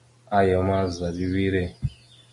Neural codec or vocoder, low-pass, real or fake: none; 10.8 kHz; real